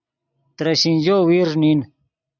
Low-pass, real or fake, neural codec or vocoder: 7.2 kHz; real; none